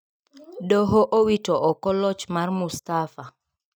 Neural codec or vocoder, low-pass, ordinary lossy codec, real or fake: none; none; none; real